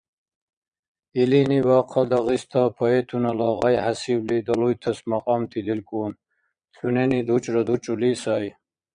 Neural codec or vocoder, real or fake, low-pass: vocoder, 22.05 kHz, 80 mel bands, Vocos; fake; 9.9 kHz